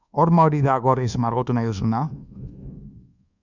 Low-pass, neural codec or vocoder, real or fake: 7.2 kHz; codec, 24 kHz, 1.2 kbps, DualCodec; fake